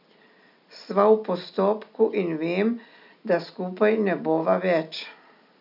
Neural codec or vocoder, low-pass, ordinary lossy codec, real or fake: none; 5.4 kHz; none; real